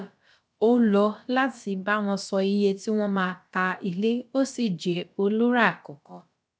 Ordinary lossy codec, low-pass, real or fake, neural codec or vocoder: none; none; fake; codec, 16 kHz, about 1 kbps, DyCAST, with the encoder's durations